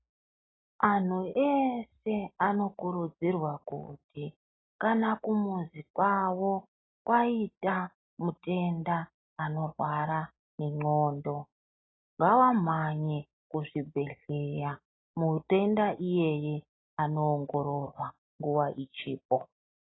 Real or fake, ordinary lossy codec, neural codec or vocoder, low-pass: real; AAC, 16 kbps; none; 7.2 kHz